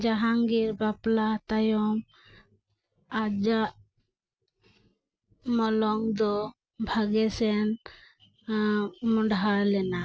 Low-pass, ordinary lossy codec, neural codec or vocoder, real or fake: none; none; none; real